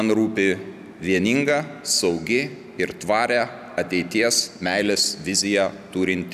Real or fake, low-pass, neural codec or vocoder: real; 14.4 kHz; none